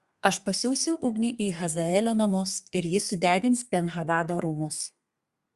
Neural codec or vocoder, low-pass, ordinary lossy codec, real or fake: codec, 32 kHz, 1.9 kbps, SNAC; 14.4 kHz; Opus, 64 kbps; fake